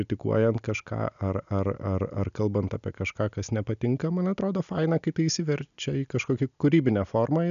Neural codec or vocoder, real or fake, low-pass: none; real; 7.2 kHz